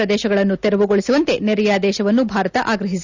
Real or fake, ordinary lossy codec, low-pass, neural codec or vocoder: real; Opus, 64 kbps; 7.2 kHz; none